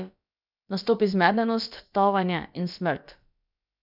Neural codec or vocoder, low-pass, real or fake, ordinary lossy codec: codec, 16 kHz, about 1 kbps, DyCAST, with the encoder's durations; 5.4 kHz; fake; none